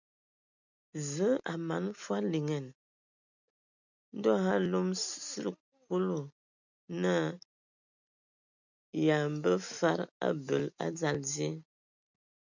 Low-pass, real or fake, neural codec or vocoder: 7.2 kHz; real; none